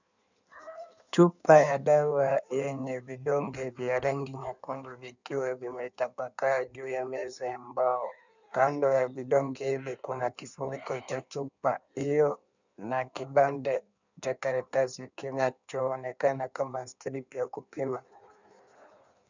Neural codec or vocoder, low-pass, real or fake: codec, 16 kHz in and 24 kHz out, 1.1 kbps, FireRedTTS-2 codec; 7.2 kHz; fake